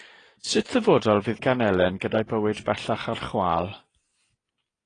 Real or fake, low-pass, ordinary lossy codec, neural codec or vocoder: real; 9.9 kHz; AAC, 32 kbps; none